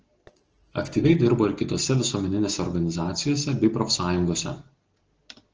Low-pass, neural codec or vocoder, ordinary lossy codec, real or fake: 7.2 kHz; none; Opus, 16 kbps; real